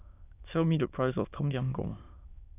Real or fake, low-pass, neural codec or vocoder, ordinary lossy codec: fake; 3.6 kHz; autoencoder, 22.05 kHz, a latent of 192 numbers a frame, VITS, trained on many speakers; none